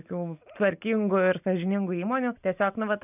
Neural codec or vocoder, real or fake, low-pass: none; real; 3.6 kHz